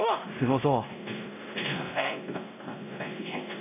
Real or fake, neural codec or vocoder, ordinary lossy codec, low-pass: fake; codec, 16 kHz, 0.5 kbps, X-Codec, WavLM features, trained on Multilingual LibriSpeech; none; 3.6 kHz